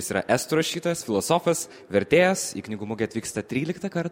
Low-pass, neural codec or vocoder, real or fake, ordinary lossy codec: 19.8 kHz; none; real; MP3, 64 kbps